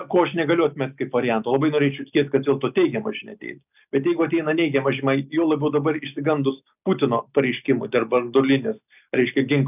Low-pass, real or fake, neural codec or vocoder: 3.6 kHz; real; none